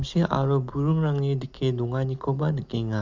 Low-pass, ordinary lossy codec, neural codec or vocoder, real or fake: 7.2 kHz; MP3, 64 kbps; none; real